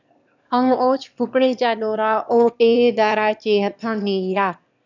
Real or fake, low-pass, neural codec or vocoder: fake; 7.2 kHz; autoencoder, 22.05 kHz, a latent of 192 numbers a frame, VITS, trained on one speaker